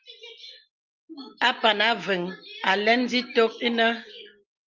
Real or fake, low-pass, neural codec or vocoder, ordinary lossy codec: real; 7.2 kHz; none; Opus, 24 kbps